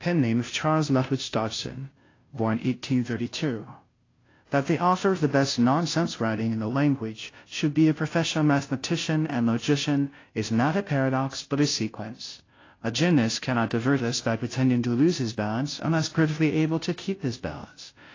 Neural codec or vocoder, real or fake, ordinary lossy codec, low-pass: codec, 16 kHz, 0.5 kbps, FunCodec, trained on Chinese and English, 25 frames a second; fake; AAC, 32 kbps; 7.2 kHz